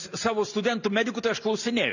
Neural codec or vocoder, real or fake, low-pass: none; real; 7.2 kHz